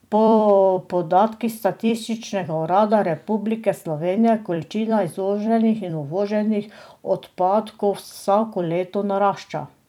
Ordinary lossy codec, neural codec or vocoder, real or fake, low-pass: none; vocoder, 44.1 kHz, 128 mel bands every 256 samples, BigVGAN v2; fake; 19.8 kHz